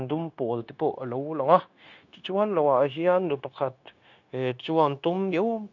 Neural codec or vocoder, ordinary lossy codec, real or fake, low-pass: codec, 16 kHz, 0.9 kbps, LongCat-Audio-Codec; none; fake; 7.2 kHz